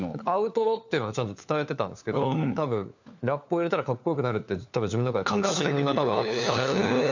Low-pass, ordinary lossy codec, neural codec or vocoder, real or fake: 7.2 kHz; none; codec, 16 kHz, 4 kbps, FunCodec, trained on LibriTTS, 50 frames a second; fake